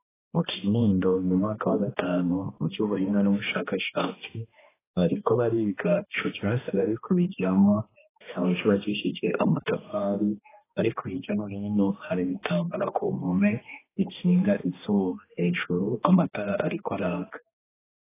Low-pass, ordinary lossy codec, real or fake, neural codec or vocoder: 3.6 kHz; AAC, 16 kbps; fake; codec, 16 kHz, 1 kbps, X-Codec, HuBERT features, trained on balanced general audio